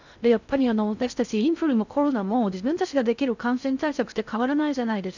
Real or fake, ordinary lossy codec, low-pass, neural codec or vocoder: fake; none; 7.2 kHz; codec, 16 kHz in and 24 kHz out, 0.6 kbps, FocalCodec, streaming, 4096 codes